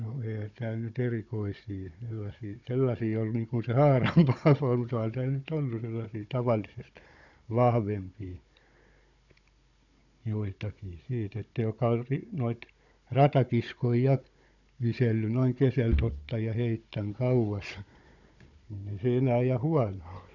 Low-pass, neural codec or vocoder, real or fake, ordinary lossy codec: 7.2 kHz; codec, 16 kHz, 16 kbps, FunCodec, trained on Chinese and English, 50 frames a second; fake; none